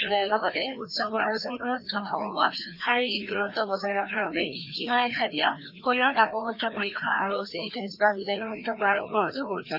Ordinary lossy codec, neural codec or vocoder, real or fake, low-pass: none; codec, 16 kHz, 2 kbps, FreqCodec, larger model; fake; 5.4 kHz